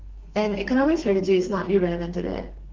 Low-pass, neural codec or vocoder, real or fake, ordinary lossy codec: 7.2 kHz; codec, 32 kHz, 1.9 kbps, SNAC; fake; Opus, 32 kbps